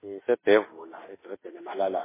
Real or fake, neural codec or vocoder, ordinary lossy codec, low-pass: fake; autoencoder, 48 kHz, 32 numbers a frame, DAC-VAE, trained on Japanese speech; MP3, 16 kbps; 3.6 kHz